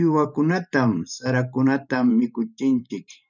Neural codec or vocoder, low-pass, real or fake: vocoder, 44.1 kHz, 128 mel bands every 512 samples, BigVGAN v2; 7.2 kHz; fake